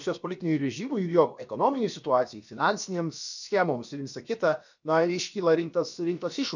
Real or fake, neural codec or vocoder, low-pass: fake; codec, 16 kHz, about 1 kbps, DyCAST, with the encoder's durations; 7.2 kHz